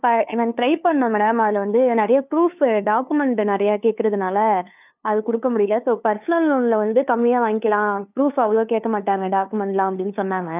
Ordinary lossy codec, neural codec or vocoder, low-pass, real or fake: none; codec, 16 kHz, 2 kbps, FunCodec, trained on LibriTTS, 25 frames a second; 3.6 kHz; fake